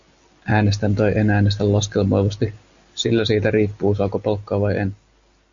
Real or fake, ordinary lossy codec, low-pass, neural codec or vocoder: real; Opus, 64 kbps; 7.2 kHz; none